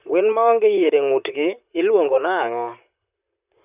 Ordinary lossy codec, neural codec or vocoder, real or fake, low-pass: none; vocoder, 44.1 kHz, 128 mel bands, Pupu-Vocoder; fake; 3.6 kHz